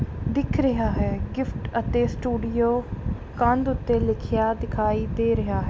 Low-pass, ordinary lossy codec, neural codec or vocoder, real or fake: none; none; none; real